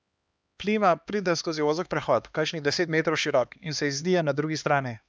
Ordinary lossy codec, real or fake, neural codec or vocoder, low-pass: none; fake; codec, 16 kHz, 2 kbps, X-Codec, HuBERT features, trained on LibriSpeech; none